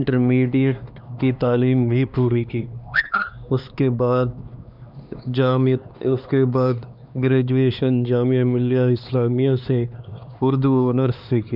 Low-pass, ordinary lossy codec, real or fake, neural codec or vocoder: 5.4 kHz; none; fake; codec, 16 kHz, 2 kbps, X-Codec, HuBERT features, trained on LibriSpeech